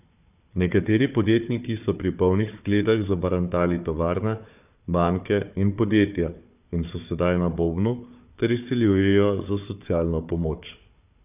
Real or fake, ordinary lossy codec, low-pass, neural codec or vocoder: fake; none; 3.6 kHz; codec, 16 kHz, 4 kbps, FunCodec, trained on Chinese and English, 50 frames a second